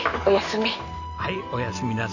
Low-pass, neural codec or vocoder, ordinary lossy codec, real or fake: 7.2 kHz; none; none; real